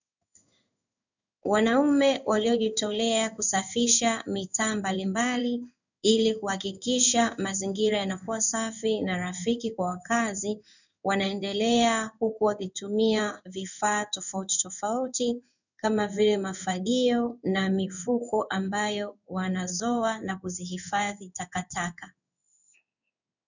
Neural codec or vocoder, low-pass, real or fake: codec, 16 kHz in and 24 kHz out, 1 kbps, XY-Tokenizer; 7.2 kHz; fake